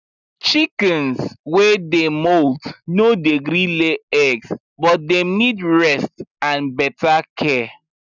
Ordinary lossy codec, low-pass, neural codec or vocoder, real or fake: none; 7.2 kHz; none; real